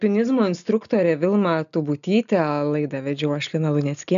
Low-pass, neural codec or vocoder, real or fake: 7.2 kHz; none; real